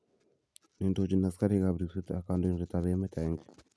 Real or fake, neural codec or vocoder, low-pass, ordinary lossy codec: real; none; none; none